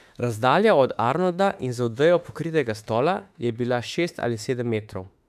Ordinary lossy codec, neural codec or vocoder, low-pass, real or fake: none; autoencoder, 48 kHz, 32 numbers a frame, DAC-VAE, trained on Japanese speech; 14.4 kHz; fake